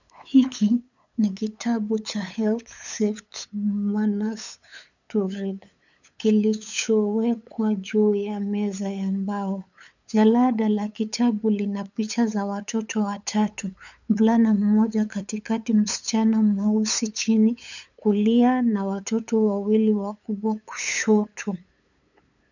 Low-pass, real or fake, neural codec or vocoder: 7.2 kHz; fake; codec, 16 kHz, 8 kbps, FunCodec, trained on LibriTTS, 25 frames a second